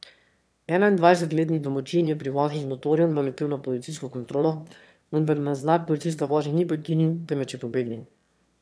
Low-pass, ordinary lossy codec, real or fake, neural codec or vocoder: none; none; fake; autoencoder, 22.05 kHz, a latent of 192 numbers a frame, VITS, trained on one speaker